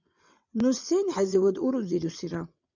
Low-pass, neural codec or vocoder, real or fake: 7.2 kHz; vocoder, 22.05 kHz, 80 mel bands, WaveNeXt; fake